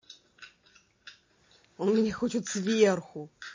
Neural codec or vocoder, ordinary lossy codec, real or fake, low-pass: none; MP3, 32 kbps; real; 7.2 kHz